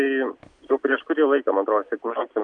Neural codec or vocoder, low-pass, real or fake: none; 9.9 kHz; real